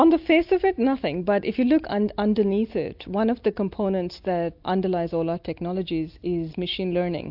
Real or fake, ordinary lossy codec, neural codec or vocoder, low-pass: real; AAC, 48 kbps; none; 5.4 kHz